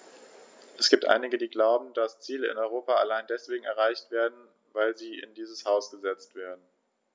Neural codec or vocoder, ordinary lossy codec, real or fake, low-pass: none; none; real; none